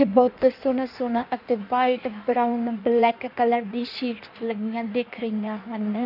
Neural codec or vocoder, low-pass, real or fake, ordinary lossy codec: codec, 16 kHz in and 24 kHz out, 1.1 kbps, FireRedTTS-2 codec; 5.4 kHz; fake; none